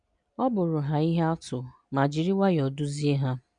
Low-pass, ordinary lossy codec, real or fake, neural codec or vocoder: 9.9 kHz; none; real; none